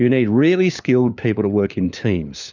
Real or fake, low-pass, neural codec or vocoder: fake; 7.2 kHz; codec, 16 kHz, 4 kbps, FunCodec, trained on LibriTTS, 50 frames a second